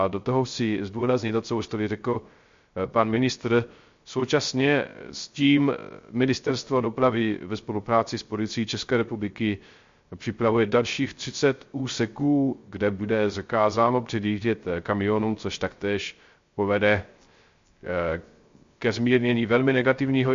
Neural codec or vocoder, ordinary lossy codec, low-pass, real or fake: codec, 16 kHz, 0.3 kbps, FocalCodec; MP3, 48 kbps; 7.2 kHz; fake